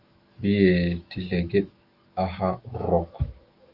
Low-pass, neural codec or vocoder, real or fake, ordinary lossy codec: 5.4 kHz; autoencoder, 48 kHz, 128 numbers a frame, DAC-VAE, trained on Japanese speech; fake; Opus, 24 kbps